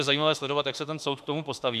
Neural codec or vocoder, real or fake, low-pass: autoencoder, 48 kHz, 32 numbers a frame, DAC-VAE, trained on Japanese speech; fake; 14.4 kHz